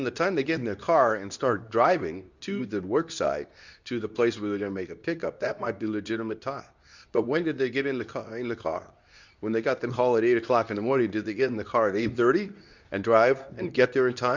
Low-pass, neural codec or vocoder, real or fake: 7.2 kHz; codec, 24 kHz, 0.9 kbps, WavTokenizer, medium speech release version 2; fake